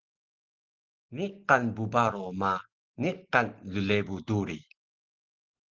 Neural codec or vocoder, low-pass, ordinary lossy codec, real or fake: none; 7.2 kHz; Opus, 16 kbps; real